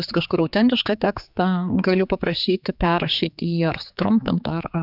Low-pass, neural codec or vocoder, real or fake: 5.4 kHz; codec, 16 kHz, 4 kbps, X-Codec, HuBERT features, trained on general audio; fake